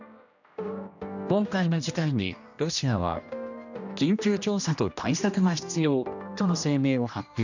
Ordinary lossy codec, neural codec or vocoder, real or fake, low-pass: none; codec, 16 kHz, 1 kbps, X-Codec, HuBERT features, trained on general audio; fake; 7.2 kHz